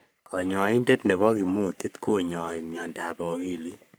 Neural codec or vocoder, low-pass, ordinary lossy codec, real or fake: codec, 44.1 kHz, 3.4 kbps, Pupu-Codec; none; none; fake